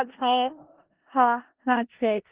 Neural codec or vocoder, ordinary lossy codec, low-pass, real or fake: codec, 16 kHz in and 24 kHz out, 0.4 kbps, LongCat-Audio-Codec, four codebook decoder; Opus, 16 kbps; 3.6 kHz; fake